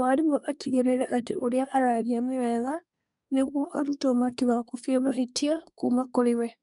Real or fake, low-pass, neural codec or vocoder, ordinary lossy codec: fake; 10.8 kHz; codec, 24 kHz, 1 kbps, SNAC; none